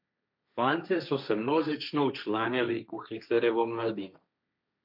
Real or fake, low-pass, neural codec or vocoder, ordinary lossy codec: fake; 5.4 kHz; codec, 16 kHz, 1.1 kbps, Voila-Tokenizer; MP3, 48 kbps